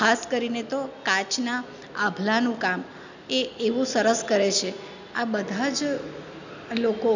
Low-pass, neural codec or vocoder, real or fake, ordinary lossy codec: 7.2 kHz; none; real; none